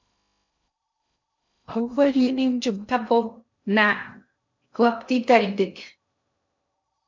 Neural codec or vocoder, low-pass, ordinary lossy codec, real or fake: codec, 16 kHz in and 24 kHz out, 0.6 kbps, FocalCodec, streaming, 2048 codes; 7.2 kHz; MP3, 48 kbps; fake